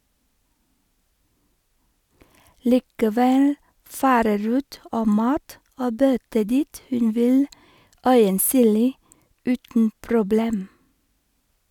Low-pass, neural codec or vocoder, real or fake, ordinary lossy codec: 19.8 kHz; none; real; none